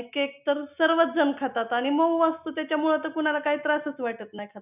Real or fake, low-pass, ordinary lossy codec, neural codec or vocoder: real; 3.6 kHz; none; none